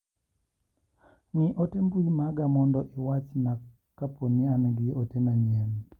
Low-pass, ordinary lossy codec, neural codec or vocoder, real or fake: 19.8 kHz; Opus, 32 kbps; none; real